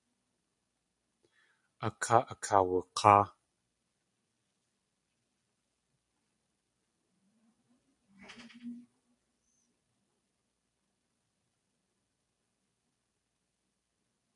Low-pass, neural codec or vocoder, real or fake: 10.8 kHz; none; real